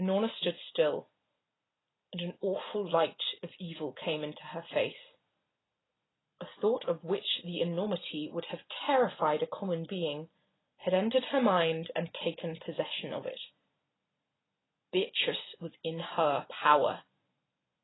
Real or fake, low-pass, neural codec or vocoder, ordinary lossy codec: real; 7.2 kHz; none; AAC, 16 kbps